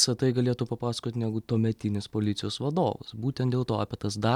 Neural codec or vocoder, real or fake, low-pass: none; real; 19.8 kHz